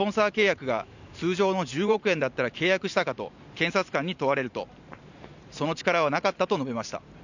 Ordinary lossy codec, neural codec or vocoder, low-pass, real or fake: none; vocoder, 44.1 kHz, 128 mel bands every 512 samples, BigVGAN v2; 7.2 kHz; fake